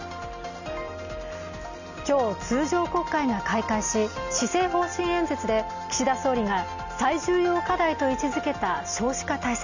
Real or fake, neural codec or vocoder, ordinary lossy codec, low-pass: real; none; none; 7.2 kHz